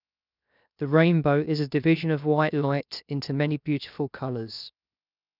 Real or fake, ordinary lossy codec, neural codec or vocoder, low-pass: fake; none; codec, 16 kHz, 0.7 kbps, FocalCodec; 5.4 kHz